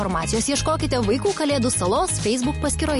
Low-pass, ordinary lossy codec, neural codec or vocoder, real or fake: 14.4 kHz; MP3, 48 kbps; none; real